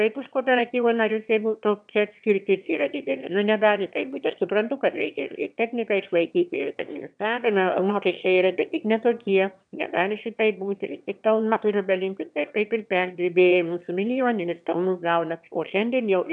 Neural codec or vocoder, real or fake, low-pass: autoencoder, 22.05 kHz, a latent of 192 numbers a frame, VITS, trained on one speaker; fake; 9.9 kHz